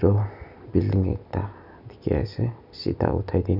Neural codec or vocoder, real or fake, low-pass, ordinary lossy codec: none; real; 5.4 kHz; none